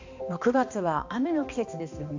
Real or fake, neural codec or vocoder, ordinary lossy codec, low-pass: fake; codec, 16 kHz, 2 kbps, X-Codec, HuBERT features, trained on balanced general audio; AAC, 48 kbps; 7.2 kHz